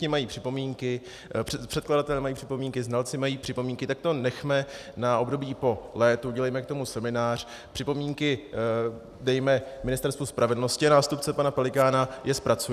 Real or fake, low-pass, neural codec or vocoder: real; 14.4 kHz; none